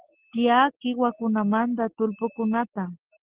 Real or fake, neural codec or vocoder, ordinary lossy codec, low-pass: real; none; Opus, 16 kbps; 3.6 kHz